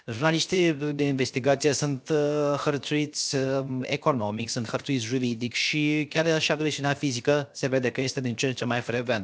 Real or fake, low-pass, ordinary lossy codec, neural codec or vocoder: fake; none; none; codec, 16 kHz, 0.7 kbps, FocalCodec